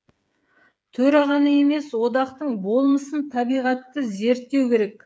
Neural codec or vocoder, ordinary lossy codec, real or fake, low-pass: codec, 16 kHz, 8 kbps, FreqCodec, smaller model; none; fake; none